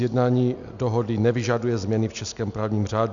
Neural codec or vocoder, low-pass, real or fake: none; 7.2 kHz; real